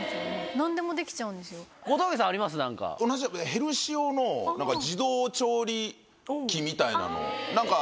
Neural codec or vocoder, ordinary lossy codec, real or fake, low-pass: none; none; real; none